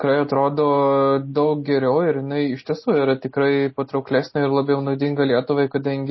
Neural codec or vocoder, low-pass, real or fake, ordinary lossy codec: none; 7.2 kHz; real; MP3, 24 kbps